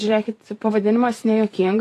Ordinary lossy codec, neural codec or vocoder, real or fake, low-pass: AAC, 48 kbps; vocoder, 44.1 kHz, 128 mel bands every 512 samples, BigVGAN v2; fake; 14.4 kHz